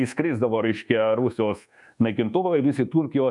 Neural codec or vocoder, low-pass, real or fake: codec, 24 kHz, 1.2 kbps, DualCodec; 10.8 kHz; fake